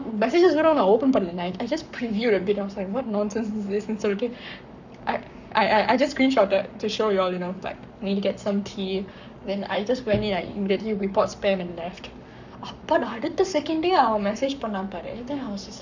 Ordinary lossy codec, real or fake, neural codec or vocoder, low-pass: none; fake; codec, 44.1 kHz, 7.8 kbps, Pupu-Codec; 7.2 kHz